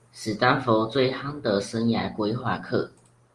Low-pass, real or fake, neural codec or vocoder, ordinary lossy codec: 10.8 kHz; real; none; Opus, 24 kbps